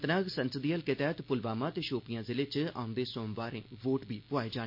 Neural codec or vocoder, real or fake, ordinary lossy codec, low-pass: none; real; MP3, 32 kbps; 5.4 kHz